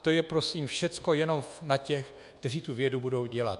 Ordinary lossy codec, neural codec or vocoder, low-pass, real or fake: MP3, 64 kbps; codec, 24 kHz, 1.2 kbps, DualCodec; 10.8 kHz; fake